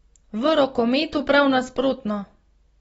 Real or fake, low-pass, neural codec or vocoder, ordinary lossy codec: real; 19.8 kHz; none; AAC, 24 kbps